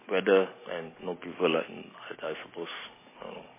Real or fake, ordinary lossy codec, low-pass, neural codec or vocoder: real; MP3, 16 kbps; 3.6 kHz; none